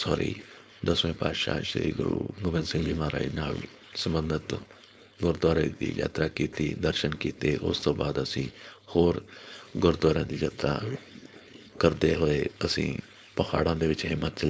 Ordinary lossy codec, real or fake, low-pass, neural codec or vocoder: none; fake; none; codec, 16 kHz, 4.8 kbps, FACodec